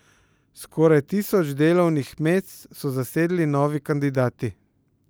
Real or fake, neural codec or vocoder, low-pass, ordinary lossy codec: real; none; none; none